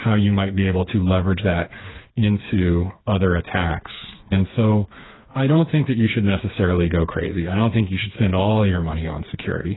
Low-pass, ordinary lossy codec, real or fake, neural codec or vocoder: 7.2 kHz; AAC, 16 kbps; fake; codec, 16 kHz, 4 kbps, FreqCodec, smaller model